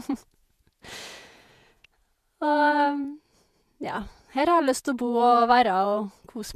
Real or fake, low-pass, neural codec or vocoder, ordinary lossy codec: fake; 14.4 kHz; vocoder, 48 kHz, 128 mel bands, Vocos; MP3, 96 kbps